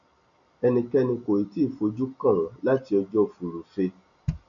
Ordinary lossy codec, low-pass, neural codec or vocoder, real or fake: none; 7.2 kHz; none; real